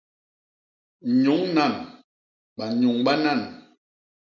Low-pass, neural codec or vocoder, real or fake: 7.2 kHz; none; real